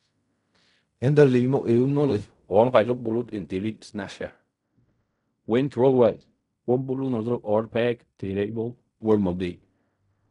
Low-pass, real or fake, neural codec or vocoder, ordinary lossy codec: 10.8 kHz; fake; codec, 16 kHz in and 24 kHz out, 0.4 kbps, LongCat-Audio-Codec, fine tuned four codebook decoder; none